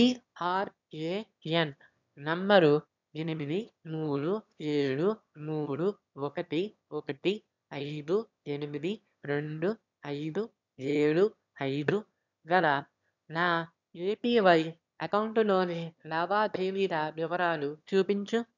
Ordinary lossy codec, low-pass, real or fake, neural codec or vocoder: none; 7.2 kHz; fake; autoencoder, 22.05 kHz, a latent of 192 numbers a frame, VITS, trained on one speaker